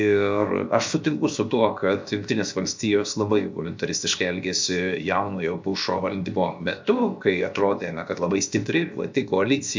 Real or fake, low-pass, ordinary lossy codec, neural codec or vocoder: fake; 7.2 kHz; MP3, 64 kbps; codec, 16 kHz, 0.7 kbps, FocalCodec